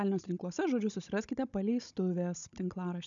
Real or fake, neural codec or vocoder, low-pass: fake; codec, 16 kHz, 16 kbps, FunCodec, trained on Chinese and English, 50 frames a second; 7.2 kHz